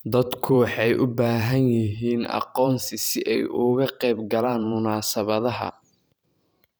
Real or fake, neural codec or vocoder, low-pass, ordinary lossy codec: fake; vocoder, 44.1 kHz, 128 mel bands every 512 samples, BigVGAN v2; none; none